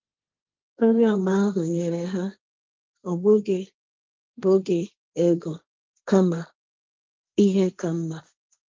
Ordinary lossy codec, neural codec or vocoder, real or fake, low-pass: Opus, 32 kbps; codec, 16 kHz, 1.1 kbps, Voila-Tokenizer; fake; 7.2 kHz